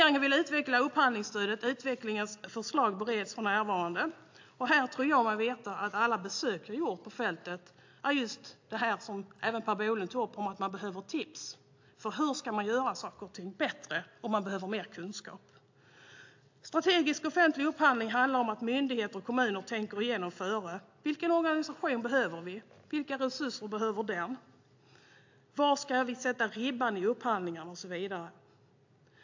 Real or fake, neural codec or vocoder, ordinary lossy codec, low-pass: real; none; AAC, 48 kbps; 7.2 kHz